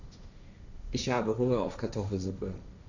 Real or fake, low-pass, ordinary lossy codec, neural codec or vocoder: fake; 7.2 kHz; none; codec, 16 kHz, 1.1 kbps, Voila-Tokenizer